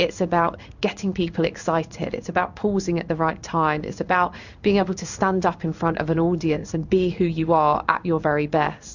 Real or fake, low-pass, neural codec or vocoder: fake; 7.2 kHz; codec, 16 kHz in and 24 kHz out, 1 kbps, XY-Tokenizer